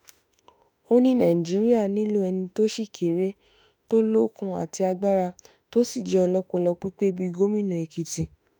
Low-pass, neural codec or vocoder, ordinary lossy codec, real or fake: 19.8 kHz; autoencoder, 48 kHz, 32 numbers a frame, DAC-VAE, trained on Japanese speech; none; fake